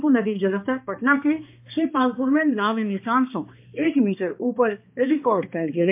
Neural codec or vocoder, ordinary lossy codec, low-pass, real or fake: codec, 16 kHz, 2 kbps, X-Codec, HuBERT features, trained on balanced general audio; MP3, 32 kbps; 3.6 kHz; fake